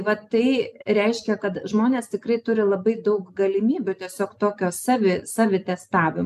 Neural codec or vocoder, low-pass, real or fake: none; 14.4 kHz; real